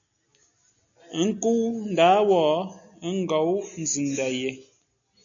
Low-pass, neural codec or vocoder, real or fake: 7.2 kHz; none; real